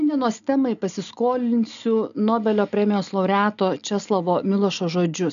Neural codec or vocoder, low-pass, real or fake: none; 7.2 kHz; real